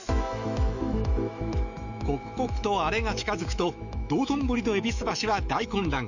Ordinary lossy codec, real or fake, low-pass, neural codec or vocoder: none; fake; 7.2 kHz; autoencoder, 48 kHz, 128 numbers a frame, DAC-VAE, trained on Japanese speech